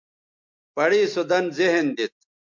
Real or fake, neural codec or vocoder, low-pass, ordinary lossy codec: real; none; 7.2 kHz; MP3, 64 kbps